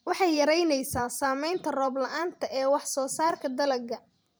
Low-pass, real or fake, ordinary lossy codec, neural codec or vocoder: none; fake; none; vocoder, 44.1 kHz, 128 mel bands every 512 samples, BigVGAN v2